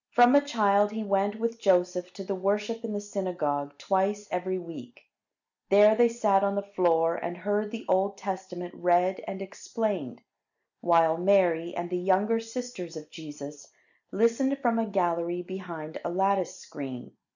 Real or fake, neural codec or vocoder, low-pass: real; none; 7.2 kHz